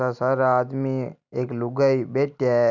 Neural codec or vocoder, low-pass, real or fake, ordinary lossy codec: none; 7.2 kHz; real; none